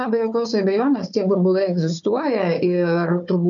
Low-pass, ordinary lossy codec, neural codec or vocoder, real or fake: 7.2 kHz; MP3, 96 kbps; codec, 16 kHz, 4 kbps, FunCodec, trained on Chinese and English, 50 frames a second; fake